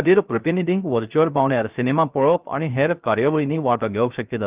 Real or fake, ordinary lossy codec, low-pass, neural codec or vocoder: fake; Opus, 32 kbps; 3.6 kHz; codec, 16 kHz, 0.3 kbps, FocalCodec